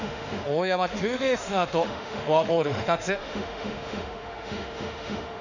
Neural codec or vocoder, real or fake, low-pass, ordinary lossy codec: autoencoder, 48 kHz, 32 numbers a frame, DAC-VAE, trained on Japanese speech; fake; 7.2 kHz; none